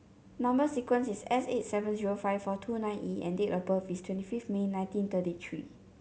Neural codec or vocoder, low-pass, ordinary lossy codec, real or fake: none; none; none; real